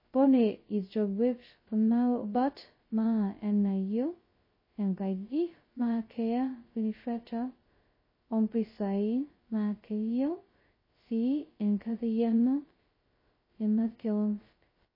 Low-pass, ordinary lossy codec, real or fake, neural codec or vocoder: 5.4 kHz; MP3, 24 kbps; fake; codec, 16 kHz, 0.2 kbps, FocalCodec